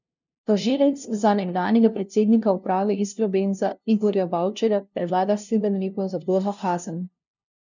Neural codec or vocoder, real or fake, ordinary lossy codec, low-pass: codec, 16 kHz, 0.5 kbps, FunCodec, trained on LibriTTS, 25 frames a second; fake; none; 7.2 kHz